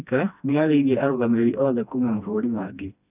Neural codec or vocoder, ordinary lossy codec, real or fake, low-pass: codec, 16 kHz, 1 kbps, FreqCodec, smaller model; none; fake; 3.6 kHz